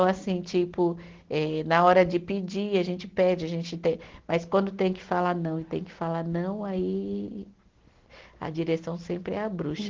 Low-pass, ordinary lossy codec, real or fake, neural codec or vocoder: 7.2 kHz; Opus, 16 kbps; real; none